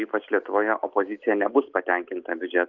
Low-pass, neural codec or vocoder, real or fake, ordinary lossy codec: 7.2 kHz; none; real; Opus, 24 kbps